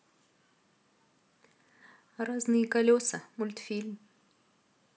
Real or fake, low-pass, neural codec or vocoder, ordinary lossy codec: real; none; none; none